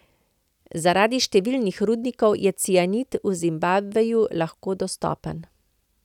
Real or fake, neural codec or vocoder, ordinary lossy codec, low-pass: real; none; none; 19.8 kHz